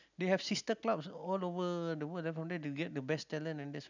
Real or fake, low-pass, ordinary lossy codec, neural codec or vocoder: real; 7.2 kHz; none; none